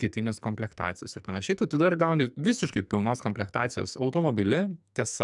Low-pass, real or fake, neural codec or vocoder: 10.8 kHz; fake; codec, 44.1 kHz, 2.6 kbps, SNAC